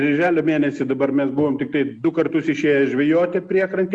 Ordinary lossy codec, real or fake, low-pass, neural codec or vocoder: Opus, 64 kbps; real; 10.8 kHz; none